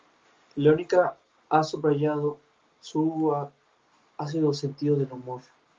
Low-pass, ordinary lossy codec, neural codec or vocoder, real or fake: 7.2 kHz; Opus, 32 kbps; none; real